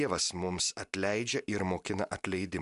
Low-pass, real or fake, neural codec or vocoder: 10.8 kHz; real; none